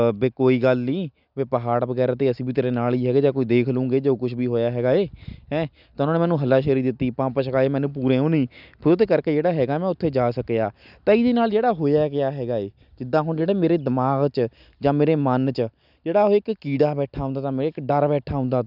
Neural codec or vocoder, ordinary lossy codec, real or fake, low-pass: none; none; real; 5.4 kHz